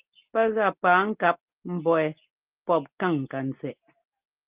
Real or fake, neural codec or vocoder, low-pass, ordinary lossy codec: real; none; 3.6 kHz; Opus, 24 kbps